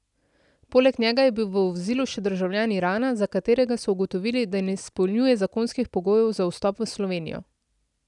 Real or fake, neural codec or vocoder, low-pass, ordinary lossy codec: real; none; 10.8 kHz; none